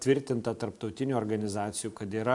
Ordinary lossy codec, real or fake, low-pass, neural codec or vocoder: AAC, 64 kbps; real; 10.8 kHz; none